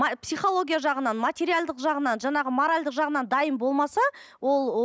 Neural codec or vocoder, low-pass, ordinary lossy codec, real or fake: none; none; none; real